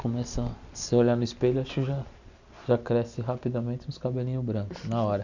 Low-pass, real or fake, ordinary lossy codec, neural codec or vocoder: 7.2 kHz; real; none; none